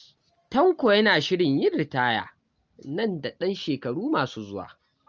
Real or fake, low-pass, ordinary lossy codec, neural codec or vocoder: real; 7.2 kHz; Opus, 24 kbps; none